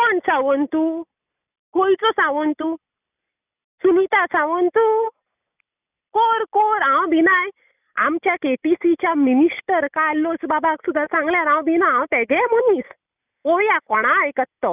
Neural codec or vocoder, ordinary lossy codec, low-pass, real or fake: none; none; 3.6 kHz; real